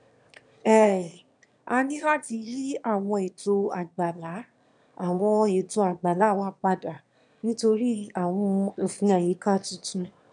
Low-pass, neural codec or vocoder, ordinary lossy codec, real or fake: 9.9 kHz; autoencoder, 22.05 kHz, a latent of 192 numbers a frame, VITS, trained on one speaker; none; fake